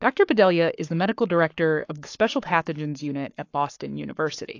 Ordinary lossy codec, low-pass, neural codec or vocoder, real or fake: AAC, 48 kbps; 7.2 kHz; codec, 44.1 kHz, 7.8 kbps, Pupu-Codec; fake